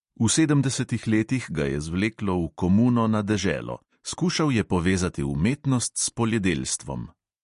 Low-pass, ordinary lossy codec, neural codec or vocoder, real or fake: 10.8 kHz; MP3, 48 kbps; none; real